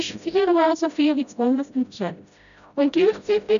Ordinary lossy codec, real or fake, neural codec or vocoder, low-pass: none; fake; codec, 16 kHz, 0.5 kbps, FreqCodec, smaller model; 7.2 kHz